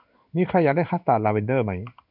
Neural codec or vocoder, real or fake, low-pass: codec, 16 kHz in and 24 kHz out, 1 kbps, XY-Tokenizer; fake; 5.4 kHz